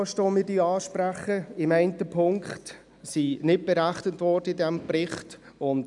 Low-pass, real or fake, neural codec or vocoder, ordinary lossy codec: 10.8 kHz; real; none; none